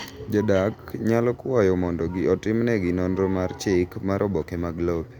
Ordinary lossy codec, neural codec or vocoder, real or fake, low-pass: Opus, 64 kbps; none; real; 19.8 kHz